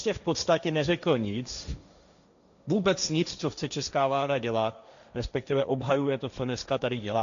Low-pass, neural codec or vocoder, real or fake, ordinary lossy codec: 7.2 kHz; codec, 16 kHz, 1.1 kbps, Voila-Tokenizer; fake; AAC, 96 kbps